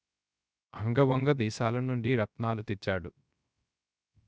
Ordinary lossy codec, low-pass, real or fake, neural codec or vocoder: none; none; fake; codec, 16 kHz, 0.3 kbps, FocalCodec